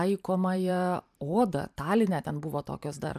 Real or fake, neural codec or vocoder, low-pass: fake; vocoder, 44.1 kHz, 128 mel bands every 512 samples, BigVGAN v2; 14.4 kHz